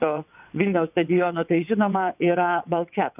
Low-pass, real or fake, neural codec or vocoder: 3.6 kHz; fake; vocoder, 22.05 kHz, 80 mel bands, WaveNeXt